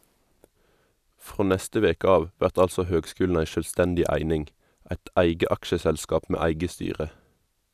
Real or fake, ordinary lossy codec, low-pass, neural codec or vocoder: real; none; 14.4 kHz; none